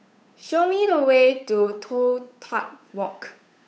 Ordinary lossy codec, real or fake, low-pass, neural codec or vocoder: none; fake; none; codec, 16 kHz, 8 kbps, FunCodec, trained on Chinese and English, 25 frames a second